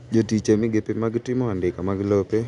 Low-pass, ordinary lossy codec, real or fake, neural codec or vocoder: 10.8 kHz; MP3, 96 kbps; real; none